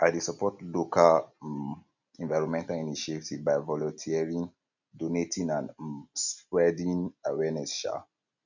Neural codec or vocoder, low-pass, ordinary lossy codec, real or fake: none; 7.2 kHz; none; real